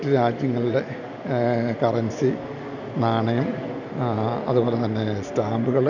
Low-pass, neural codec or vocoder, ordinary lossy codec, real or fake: 7.2 kHz; none; none; real